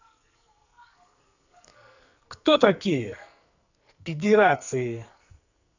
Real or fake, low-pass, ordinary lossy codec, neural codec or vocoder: fake; 7.2 kHz; none; codec, 44.1 kHz, 2.6 kbps, SNAC